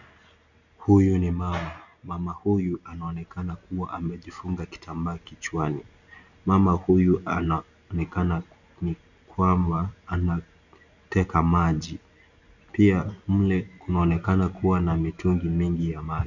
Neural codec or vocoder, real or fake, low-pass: none; real; 7.2 kHz